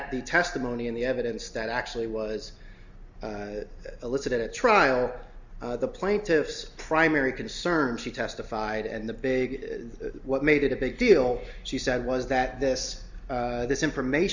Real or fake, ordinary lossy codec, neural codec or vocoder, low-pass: real; Opus, 64 kbps; none; 7.2 kHz